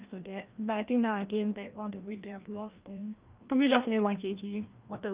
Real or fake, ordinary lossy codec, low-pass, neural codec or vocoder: fake; Opus, 24 kbps; 3.6 kHz; codec, 16 kHz, 1 kbps, FreqCodec, larger model